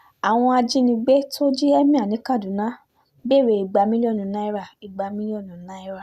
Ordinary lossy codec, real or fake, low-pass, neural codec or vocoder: Opus, 64 kbps; real; 14.4 kHz; none